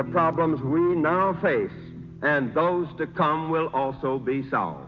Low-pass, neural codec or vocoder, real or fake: 7.2 kHz; none; real